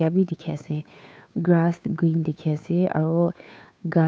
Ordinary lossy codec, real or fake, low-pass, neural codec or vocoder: none; fake; none; codec, 16 kHz, 2 kbps, FunCodec, trained on Chinese and English, 25 frames a second